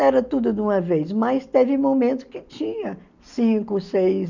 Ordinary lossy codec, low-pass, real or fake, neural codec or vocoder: none; 7.2 kHz; real; none